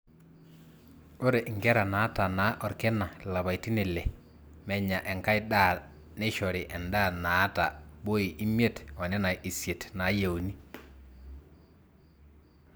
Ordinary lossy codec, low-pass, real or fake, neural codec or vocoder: none; none; real; none